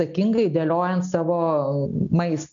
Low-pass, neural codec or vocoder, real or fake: 7.2 kHz; none; real